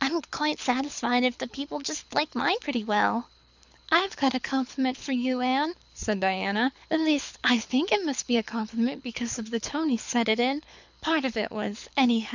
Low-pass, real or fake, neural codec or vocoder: 7.2 kHz; fake; codec, 24 kHz, 6 kbps, HILCodec